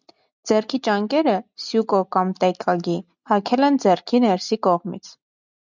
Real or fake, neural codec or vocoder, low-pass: real; none; 7.2 kHz